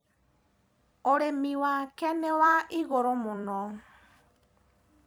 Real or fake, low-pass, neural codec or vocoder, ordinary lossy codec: fake; none; vocoder, 44.1 kHz, 128 mel bands every 256 samples, BigVGAN v2; none